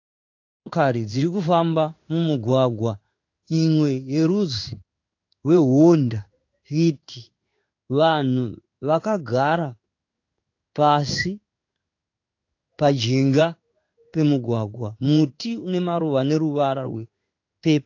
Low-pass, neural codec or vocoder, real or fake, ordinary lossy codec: 7.2 kHz; codec, 16 kHz in and 24 kHz out, 1 kbps, XY-Tokenizer; fake; AAC, 48 kbps